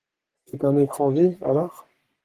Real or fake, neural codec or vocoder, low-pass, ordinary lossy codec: fake; codec, 44.1 kHz, 3.4 kbps, Pupu-Codec; 14.4 kHz; Opus, 24 kbps